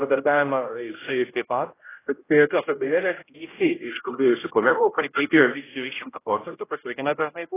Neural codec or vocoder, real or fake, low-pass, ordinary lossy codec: codec, 16 kHz, 0.5 kbps, X-Codec, HuBERT features, trained on general audio; fake; 3.6 kHz; AAC, 16 kbps